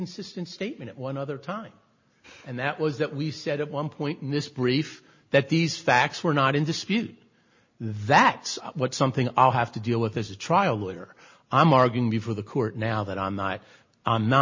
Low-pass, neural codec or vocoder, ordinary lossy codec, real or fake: 7.2 kHz; none; MP3, 32 kbps; real